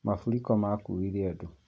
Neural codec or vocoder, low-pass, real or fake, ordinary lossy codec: none; none; real; none